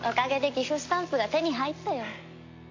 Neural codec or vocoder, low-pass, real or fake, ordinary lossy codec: none; 7.2 kHz; real; MP3, 48 kbps